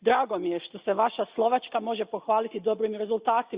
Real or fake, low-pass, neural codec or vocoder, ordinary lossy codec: real; 3.6 kHz; none; Opus, 64 kbps